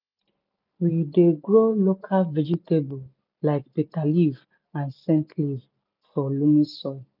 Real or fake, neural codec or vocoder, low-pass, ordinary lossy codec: real; none; 5.4 kHz; none